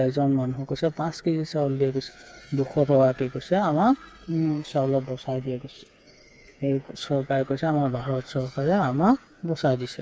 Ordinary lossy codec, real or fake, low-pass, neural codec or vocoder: none; fake; none; codec, 16 kHz, 4 kbps, FreqCodec, smaller model